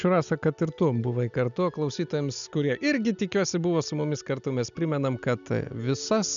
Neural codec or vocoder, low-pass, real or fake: none; 7.2 kHz; real